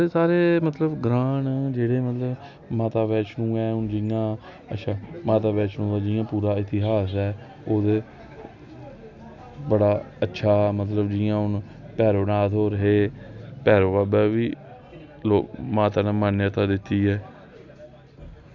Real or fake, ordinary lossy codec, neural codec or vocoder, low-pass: real; none; none; 7.2 kHz